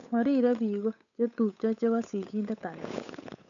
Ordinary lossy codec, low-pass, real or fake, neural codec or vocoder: none; 7.2 kHz; fake; codec, 16 kHz, 8 kbps, FunCodec, trained on Chinese and English, 25 frames a second